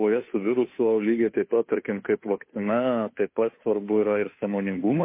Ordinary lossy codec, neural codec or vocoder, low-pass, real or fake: MP3, 24 kbps; codec, 16 kHz, 2 kbps, FunCodec, trained on Chinese and English, 25 frames a second; 3.6 kHz; fake